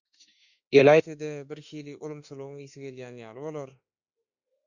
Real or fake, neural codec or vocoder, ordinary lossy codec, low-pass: fake; autoencoder, 48 kHz, 32 numbers a frame, DAC-VAE, trained on Japanese speech; Opus, 64 kbps; 7.2 kHz